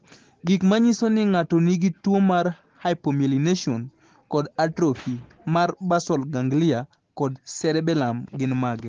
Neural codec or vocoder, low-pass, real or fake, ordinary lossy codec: none; 7.2 kHz; real; Opus, 16 kbps